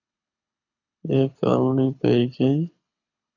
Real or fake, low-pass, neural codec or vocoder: fake; 7.2 kHz; codec, 24 kHz, 6 kbps, HILCodec